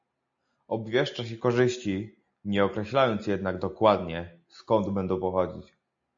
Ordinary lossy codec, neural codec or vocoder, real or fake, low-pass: AAC, 64 kbps; none; real; 7.2 kHz